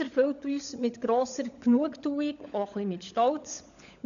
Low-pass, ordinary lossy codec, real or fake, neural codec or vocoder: 7.2 kHz; MP3, 96 kbps; fake; codec, 16 kHz, 16 kbps, FunCodec, trained on LibriTTS, 50 frames a second